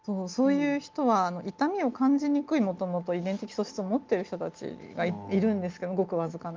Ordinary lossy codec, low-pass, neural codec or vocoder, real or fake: Opus, 24 kbps; 7.2 kHz; none; real